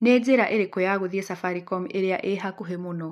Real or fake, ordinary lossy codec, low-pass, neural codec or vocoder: real; MP3, 96 kbps; 14.4 kHz; none